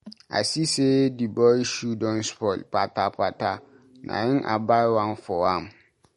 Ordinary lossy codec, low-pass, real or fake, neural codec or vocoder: MP3, 48 kbps; 14.4 kHz; real; none